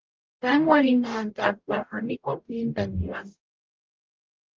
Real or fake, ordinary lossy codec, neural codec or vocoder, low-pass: fake; Opus, 32 kbps; codec, 44.1 kHz, 0.9 kbps, DAC; 7.2 kHz